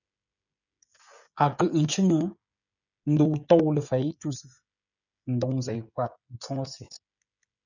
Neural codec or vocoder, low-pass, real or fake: codec, 16 kHz, 16 kbps, FreqCodec, smaller model; 7.2 kHz; fake